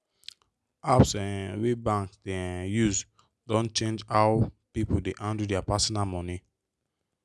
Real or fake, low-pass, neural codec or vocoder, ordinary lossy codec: real; none; none; none